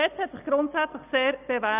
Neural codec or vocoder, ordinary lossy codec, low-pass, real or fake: none; none; 3.6 kHz; real